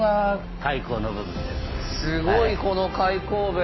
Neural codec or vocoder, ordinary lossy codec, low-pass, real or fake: none; MP3, 24 kbps; 7.2 kHz; real